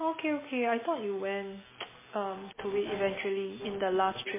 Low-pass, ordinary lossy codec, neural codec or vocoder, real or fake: 3.6 kHz; MP3, 16 kbps; none; real